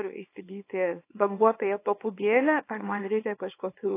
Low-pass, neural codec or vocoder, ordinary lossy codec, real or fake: 3.6 kHz; codec, 24 kHz, 0.9 kbps, WavTokenizer, small release; AAC, 24 kbps; fake